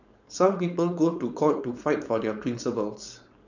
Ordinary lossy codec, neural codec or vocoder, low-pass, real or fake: none; codec, 16 kHz, 4.8 kbps, FACodec; 7.2 kHz; fake